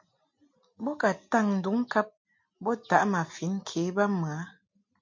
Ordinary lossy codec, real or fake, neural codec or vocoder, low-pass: MP3, 48 kbps; real; none; 7.2 kHz